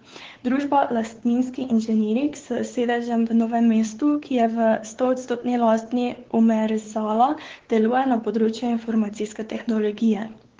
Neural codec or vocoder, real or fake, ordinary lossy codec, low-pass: codec, 16 kHz, 4 kbps, X-Codec, WavLM features, trained on Multilingual LibriSpeech; fake; Opus, 16 kbps; 7.2 kHz